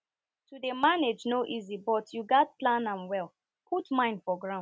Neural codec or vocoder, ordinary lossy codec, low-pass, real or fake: none; none; none; real